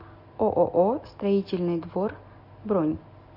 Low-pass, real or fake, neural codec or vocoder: 5.4 kHz; real; none